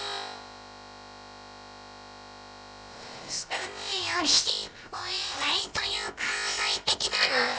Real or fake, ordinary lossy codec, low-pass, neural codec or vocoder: fake; none; none; codec, 16 kHz, about 1 kbps, DyCAST, with the encoder's durations